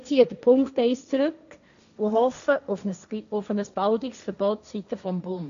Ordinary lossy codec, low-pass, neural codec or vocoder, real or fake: none; 7.2 kHz; codec, 16 kHz, 1.1 kbps, Voila-Tokenizer; fake